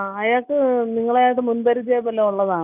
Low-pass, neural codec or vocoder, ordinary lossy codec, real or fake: 3.6 kHz; none; none; real